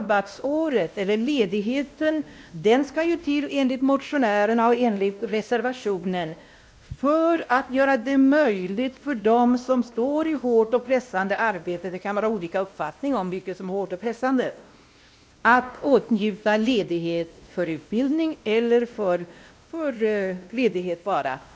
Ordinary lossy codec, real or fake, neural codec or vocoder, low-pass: none; fake; codec, 16 kHz, 1 kbps, X-Codec, WavLM features, trained on Multilingual LibriSpeech; none